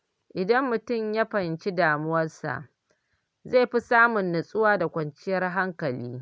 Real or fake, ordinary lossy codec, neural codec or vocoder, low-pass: real; none; none; none